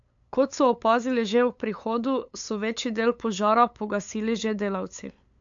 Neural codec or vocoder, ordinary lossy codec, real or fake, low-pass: codec, 16 kHz, 8 kbps, FunCodec, trained on LibriTTS, 25 frames a second; AAC, 64 kbps; fake; 7.2 kHz